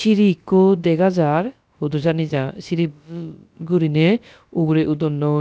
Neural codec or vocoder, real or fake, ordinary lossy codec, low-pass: codec, 16 kHz, about 1 kbps, DyCAST, with the encoder's durations; fake; none; none